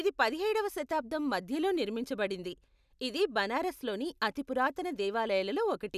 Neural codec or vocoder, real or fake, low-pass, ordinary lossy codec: none; real; 14.4 kHz; none